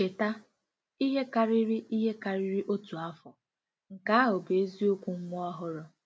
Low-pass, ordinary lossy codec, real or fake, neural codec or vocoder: none; none; real; none